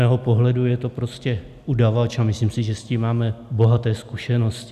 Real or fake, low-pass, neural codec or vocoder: real; 14.4 kHz; none